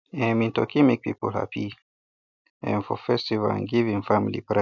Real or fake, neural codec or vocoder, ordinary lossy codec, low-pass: real; none; none; 7.2 kHz